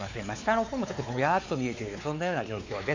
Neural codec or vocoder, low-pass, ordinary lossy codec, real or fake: codec, 16 kHz, 4 kbps, X-Codec, WavLM features, trained on Multilingual LibriSpeech; 7.2 kHz; AAC, 48 kbps; fake